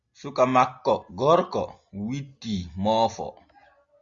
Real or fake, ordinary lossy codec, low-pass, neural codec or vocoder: real; Opus, 64 kbps; 7.2 kHz; none